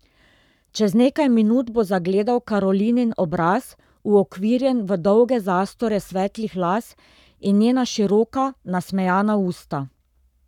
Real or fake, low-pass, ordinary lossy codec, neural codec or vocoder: fake; 19.8 kHz; none; codec, 44.1 kHz, 7.8 kbps, Pupu-Codec